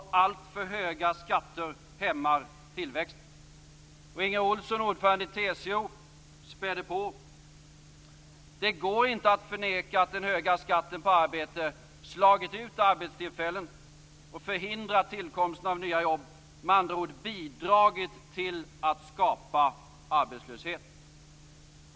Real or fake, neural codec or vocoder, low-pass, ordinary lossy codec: real; none; none; none